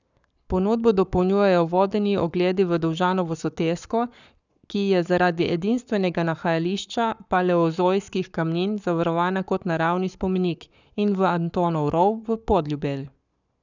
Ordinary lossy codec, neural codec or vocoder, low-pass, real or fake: none; codec, 44.1 kHz, 7.8 kbps, Pupu-Codec; 7.2 kHz; fake